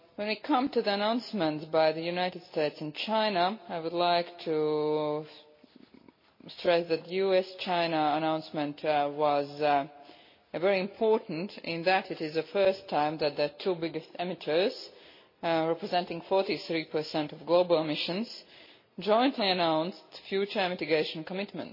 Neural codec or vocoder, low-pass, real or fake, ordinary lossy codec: none; 5.4 kHz; real; MP3, 24 kbps